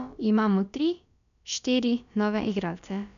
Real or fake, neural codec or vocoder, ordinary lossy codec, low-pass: fake; codec, 16 kHz, about 1 kbps, DyCAST, with the encoder's durations; none; 7.2 kHz